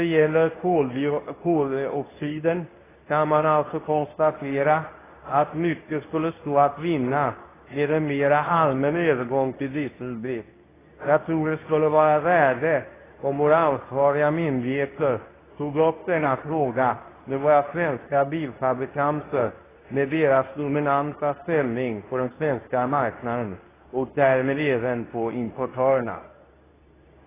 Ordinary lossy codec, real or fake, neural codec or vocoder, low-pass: AAC, 16 kbps; fake; codec, 24 kHz, 0.9 kbps, WavTokenizer, medium speech release version 2; 3.6 kHz